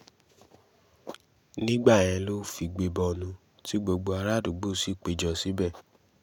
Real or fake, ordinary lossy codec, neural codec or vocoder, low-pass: real; none; none; 19.8 kHz